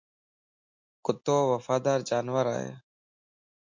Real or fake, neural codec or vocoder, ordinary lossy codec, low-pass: real; none; MP3, 48 kbps; 7.2 kHz